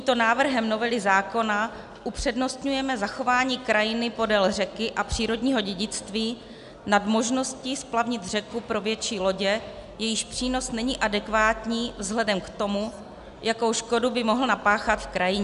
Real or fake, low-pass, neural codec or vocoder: real; 10.8 kHz; none